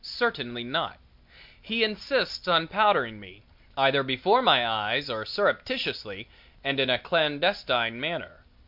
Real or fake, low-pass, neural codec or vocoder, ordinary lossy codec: real; 5.4 kHz; none; MP3, 48 kbps